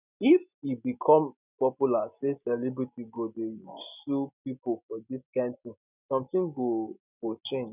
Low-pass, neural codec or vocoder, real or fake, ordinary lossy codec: 3.6 kHz; none; real; none